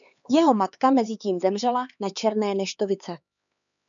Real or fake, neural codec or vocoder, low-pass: fake; codec, 16 kHz, 4 kbps, X-Codec, HuBERT features, trained on LibriSpeech; 7.2 kHz